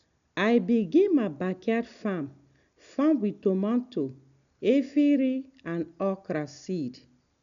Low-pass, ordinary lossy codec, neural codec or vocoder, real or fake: 7.2 kHz; MP3, 96 kbps; none; real